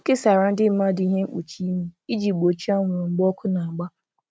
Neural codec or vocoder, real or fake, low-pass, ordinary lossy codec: none; real; none; none